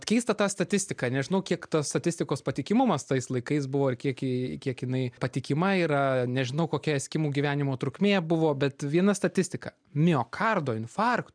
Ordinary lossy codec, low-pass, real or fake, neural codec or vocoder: MP3, 96 kbps; 9.9 kHz; real; none